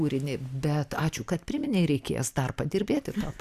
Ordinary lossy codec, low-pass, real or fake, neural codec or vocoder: Opus, 64 kbps; 14.4 kHz; real; none